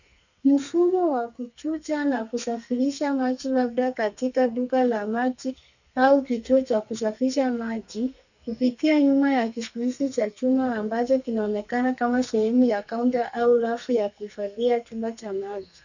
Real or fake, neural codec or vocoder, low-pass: fake; codec, 32 kHz, 1.9 kbps, SNAC; 7.2 kHz